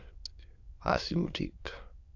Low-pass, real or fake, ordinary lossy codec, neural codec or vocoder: 7.2 kHz; fake; AAC, 48 kbps; autoencoder, 22.05 kHz, a latent of 192 numbers a frame, VITS, trained on many speakers